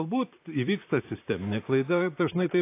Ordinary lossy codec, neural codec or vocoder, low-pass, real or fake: AAC, 24 kbps; vocoder, 44.1 kHz, 128 mel bands, Pupu-Vocoder; 3.6 kHz; fake